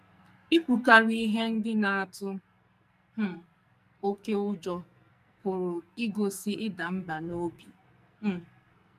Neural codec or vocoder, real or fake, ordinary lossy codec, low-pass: codec, 44.1 kHz, 2.6 kbps, SNAC; fake; MP3, 96 kbps; 14.4 kHz